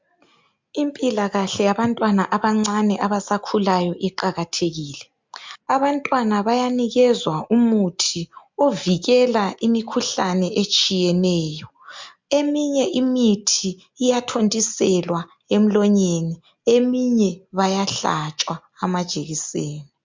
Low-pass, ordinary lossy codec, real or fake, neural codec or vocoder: 7.2 kHz; MP3, 64 kbps; real; none